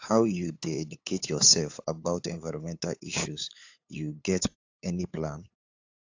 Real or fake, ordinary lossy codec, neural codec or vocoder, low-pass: fake; AAC, 48 kbps; codec, 16 kHz, 8 kbps, FunCodec, trained on LibriTTS, 25 frames a second; 7.2 kHz